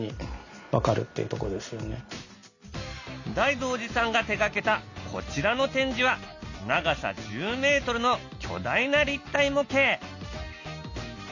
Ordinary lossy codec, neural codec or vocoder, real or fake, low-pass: none; none; real; 7.2 kHz